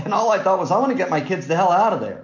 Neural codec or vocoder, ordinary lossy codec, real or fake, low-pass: none; MP3, 48 kbps; real; 7.2 kHz